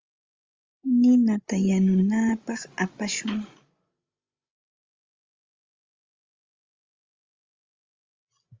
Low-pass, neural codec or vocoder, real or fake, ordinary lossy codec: 7.2 kHz; codec, 16 kHz, 16 kbps, FreqCodec, larger model; fake; Opus, 32 kbps